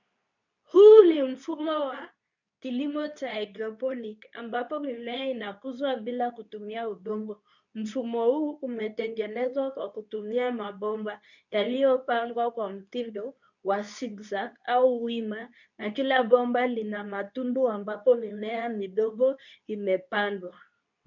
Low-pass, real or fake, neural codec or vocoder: 7.2 kHz; fake; codec, 24 kHz, 0.9 kbps, WavTokenizer, medium speech release version 2